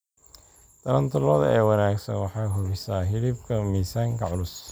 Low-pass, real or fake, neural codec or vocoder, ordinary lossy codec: none; real; none; none